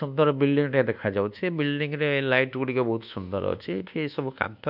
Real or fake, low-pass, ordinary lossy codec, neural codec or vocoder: fake; 5.4 kHz; none; codec, 24 kHz, 1.2 kbps, DualCodec